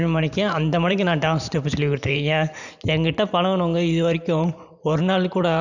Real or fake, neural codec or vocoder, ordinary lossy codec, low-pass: fake; vocoder, 44.1 kHz, 128 mel bands every 512 samples, BigVGAN v2; none; 7.2 kHz